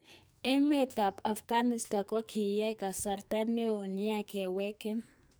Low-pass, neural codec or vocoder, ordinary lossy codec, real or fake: none; codec, 44.1 kHz, 2.6 kbps, SNAC; none; fake